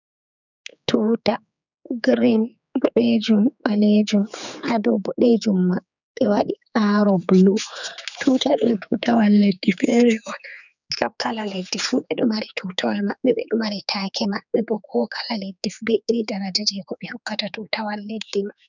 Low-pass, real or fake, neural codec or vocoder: 7.2 kHz; fake; codec, 16 kHz, 4 kbps, X-Codec, HuBERT features, trained on general audio